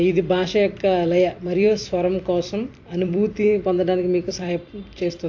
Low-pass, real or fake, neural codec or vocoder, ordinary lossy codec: 7.2 kHz; fake; vocoder, 44.1 kHz, 128 mel bands every 256 samples, BigVGAN v2; AAC, 48 kbps